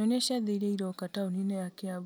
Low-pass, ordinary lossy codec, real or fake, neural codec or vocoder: none; none; real; none